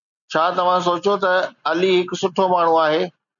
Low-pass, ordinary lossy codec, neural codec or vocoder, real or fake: 7.2 kHz; MP3, 96 kbps; none; real